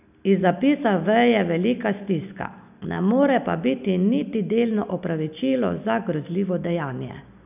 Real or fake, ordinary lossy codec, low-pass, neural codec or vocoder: real; none; 3.6 kHz; none